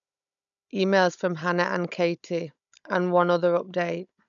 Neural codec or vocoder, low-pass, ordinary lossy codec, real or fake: codec, 16 kHz, 16 kbps, FunCodec, trained on Chinese and English, 50 frames a second; 7.2 kHz; MP3, 96 kbps; fake